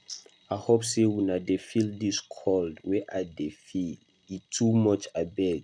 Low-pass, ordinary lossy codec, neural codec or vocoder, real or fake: 9.9 kHz; none; none; real